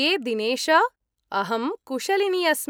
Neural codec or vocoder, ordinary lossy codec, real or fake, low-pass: none; none; real; none